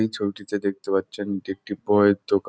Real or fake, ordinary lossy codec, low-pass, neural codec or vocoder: real; none; none; none